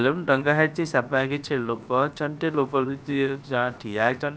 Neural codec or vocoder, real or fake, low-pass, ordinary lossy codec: codec, 16 kHz, about 1 kbps, DyCAST, with the encoder's durations; fake; none; none